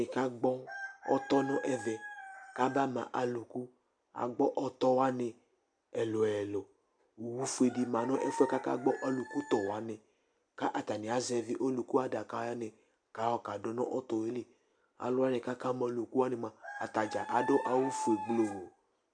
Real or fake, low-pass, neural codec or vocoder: real; 9.9 kHz; none